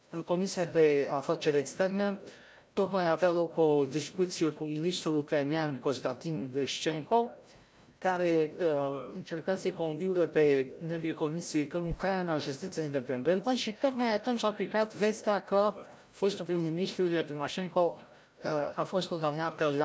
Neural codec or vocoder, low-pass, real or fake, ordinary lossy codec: codec, 16 kHz, 0.5 kbps, FreqCodec, larger model; none; fake; none